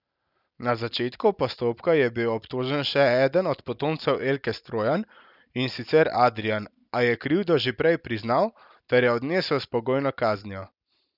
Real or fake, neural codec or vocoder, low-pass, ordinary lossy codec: real; none; 5.4 kHz; none